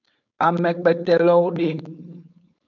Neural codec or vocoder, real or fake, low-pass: codec, 16 kHz, 4.8 kbps, FACodec; fake; 7.2 kHz